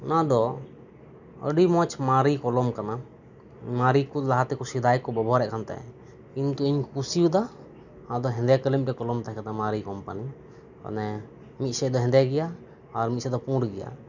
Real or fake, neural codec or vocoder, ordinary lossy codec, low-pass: real; none; none; 7.2 kHz